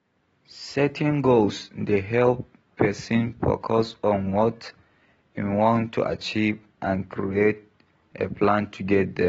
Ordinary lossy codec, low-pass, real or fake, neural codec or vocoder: AAC, 24 kbps; 14.4 kHz; real; none